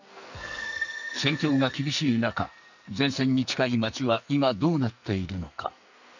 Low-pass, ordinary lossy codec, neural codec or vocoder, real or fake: 7.2 kHz; none; codec, 44.1 kHz, 2.6 kbps, SNAC; fake